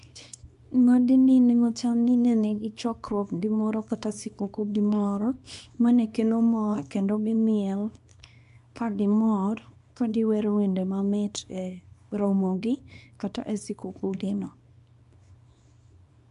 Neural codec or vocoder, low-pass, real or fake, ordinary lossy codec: codec, 24 kHz, 0.9 kbps, WavTokenizer, small release; 10.8 kHz; fake; MP3, 64 kbps